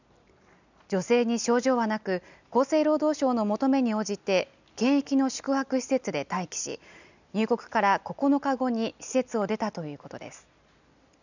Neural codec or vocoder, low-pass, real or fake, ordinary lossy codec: none; 7.2 kHz; real; none